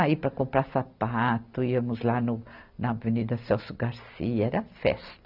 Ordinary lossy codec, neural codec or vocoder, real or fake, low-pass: none; none; real; 5.4 kHz